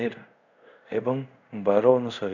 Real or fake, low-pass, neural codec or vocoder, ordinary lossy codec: fake; 7.2 kHz; codec, 24 kHz, 0.5 kbps, DualCodec; none